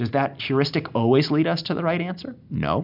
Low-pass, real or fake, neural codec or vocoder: 5.4 kHz; real; none